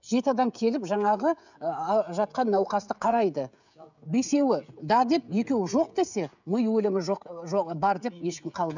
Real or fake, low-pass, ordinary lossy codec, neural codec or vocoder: fake; 7.2 kHz; none; codec, 16 kHz, 16 kbps, FreqCodec, smaller model